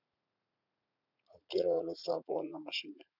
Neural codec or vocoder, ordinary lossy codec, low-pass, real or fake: vocoder, 44.1 kHz, 80 mel bands, Vocos; none; 5.4 kHz; fake